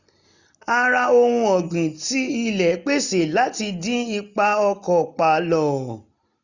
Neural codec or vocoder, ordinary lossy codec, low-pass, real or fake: none; none; 7.2 kHz; real